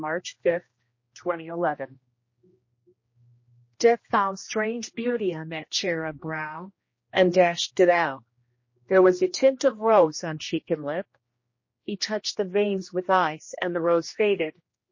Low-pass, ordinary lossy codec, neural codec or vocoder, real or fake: 7.2 kHz; MP3, 32 kbps; codec, 16 kHz, 1 kbps, X-Codec, HuBERT features, trained on general audio; fake